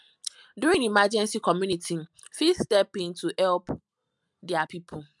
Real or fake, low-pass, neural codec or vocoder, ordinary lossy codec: real; 10.8 kHz; none; MP3, 96 kbps